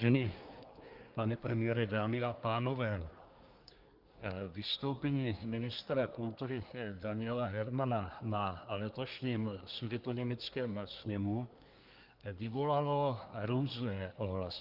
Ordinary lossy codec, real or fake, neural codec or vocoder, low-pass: Opus, 32 kbps; fake; codec, 24 kHz, 1 kbps, SNAC; 5.4 kHz